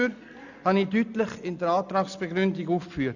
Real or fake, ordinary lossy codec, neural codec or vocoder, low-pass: real; none; none; 7.2 kHz